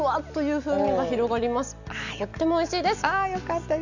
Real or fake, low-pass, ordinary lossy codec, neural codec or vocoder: real; 7.2 kHz; none; none